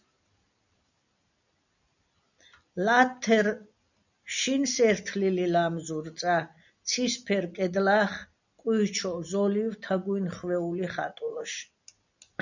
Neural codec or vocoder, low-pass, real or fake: none; 7.2 kHz; real